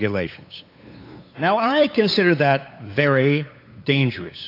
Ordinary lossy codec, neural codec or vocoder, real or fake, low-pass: AAC, 32 kbps; codec, 16 kHz, 8 kbps, FunCodec, trained on LibriTTS, 25 frames a second; fake; 5.4 kHz